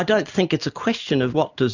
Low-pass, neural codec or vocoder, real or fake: 7.2 kHz; none; real